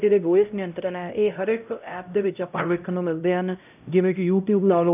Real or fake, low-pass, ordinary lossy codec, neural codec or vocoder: fake; 3.6 kHz; none; codec, 16 kHz, 0.5 kbps, X-Codec, HuBERT features, trained on LibriSpeech